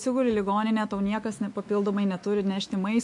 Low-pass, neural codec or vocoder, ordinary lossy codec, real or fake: 10.8 kHz; autoencoder, 48 kHz, 128 numbers a frame, DAC-VAE, trained on Japanese speech; MP3, 48 kbps; fake